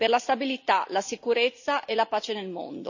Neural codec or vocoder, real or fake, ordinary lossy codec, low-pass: none; real; none; 7.2 kHz